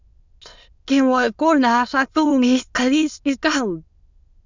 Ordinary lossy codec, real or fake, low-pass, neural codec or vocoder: Opus, 64 kbps; fake; 7.2 kHz; autoencoder, 22.05 kHz, a latent of 192 numbers a frame, VITS, trained on many speakers